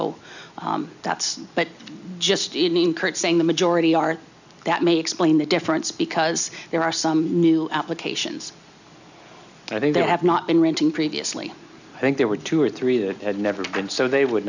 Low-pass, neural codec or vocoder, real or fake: 7.2 kHz; none; real